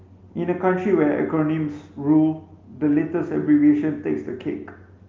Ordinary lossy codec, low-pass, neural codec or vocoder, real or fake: Opus, 24 kbps; 7.2 kHz; none; real